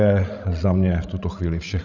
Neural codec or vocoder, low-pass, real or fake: codec, 16 kHz, 16 kbps, FreqCodec, larger model; 7.2 kHz; fake